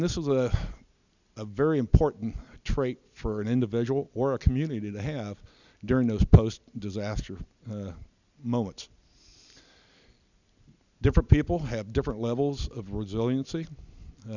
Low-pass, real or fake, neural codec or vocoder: 7.2 kHz; real; none